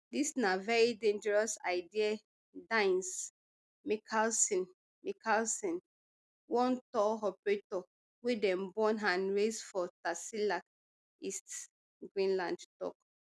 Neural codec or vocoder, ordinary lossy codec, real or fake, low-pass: none; none; real; none